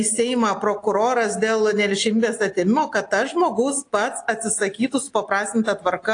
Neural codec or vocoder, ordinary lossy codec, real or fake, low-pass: none; AAC, 48 kbps; real; 9.9 kHz